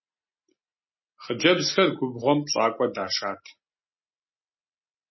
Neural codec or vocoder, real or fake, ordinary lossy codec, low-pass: none; real; MP3, 24 kbps; 7.2 kHz